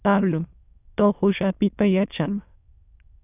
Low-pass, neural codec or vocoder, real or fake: 3.6 kHz; autoencoder, 22.05 kHz, a latent of 192 numbers a frame, VITS, trained on many speakers; fake